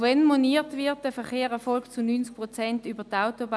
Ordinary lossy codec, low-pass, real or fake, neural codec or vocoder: none; none; real; none